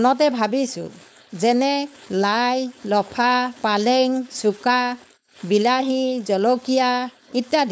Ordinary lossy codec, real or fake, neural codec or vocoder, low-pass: none; fake; codec, 16 kHz, 4.8 kbps, FACodec; none